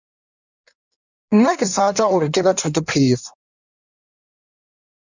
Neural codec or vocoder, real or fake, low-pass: codec, 16 kHz in and 24 kHz out, 1.1 kbps, FireRedTTS-2 codec; fake; 7.2 kHz